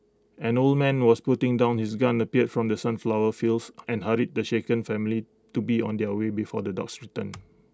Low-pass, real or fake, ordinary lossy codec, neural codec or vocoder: none; real; none; none